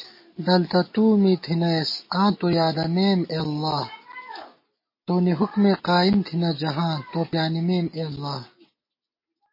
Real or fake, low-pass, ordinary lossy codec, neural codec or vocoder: real; 5.4 kHz; MP3, 24 kbps; none